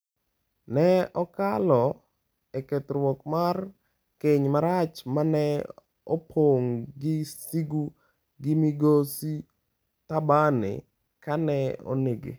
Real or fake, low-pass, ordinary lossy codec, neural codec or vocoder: real; none; none; none